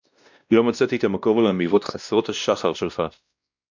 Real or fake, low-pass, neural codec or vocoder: fake; 7.2 kHz; codec, 16 kHz, 1 kbps, X-Codec, WavLM features, trained on Multilingual LibriSpeech